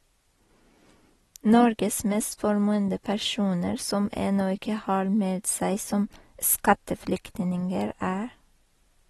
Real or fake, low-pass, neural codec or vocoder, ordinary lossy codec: real; 19.8 kHz; none; AAC, 32 kbps